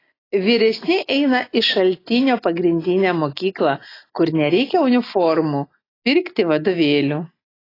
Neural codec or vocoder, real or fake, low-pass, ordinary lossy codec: none; real; 5.4 kHz; AAC, 24 kbps